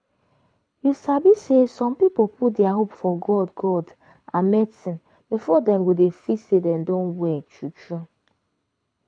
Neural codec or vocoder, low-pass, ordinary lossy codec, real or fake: codec, 24 kHz, 6 kbps, HILCodec; 9.9 kHz; none; fake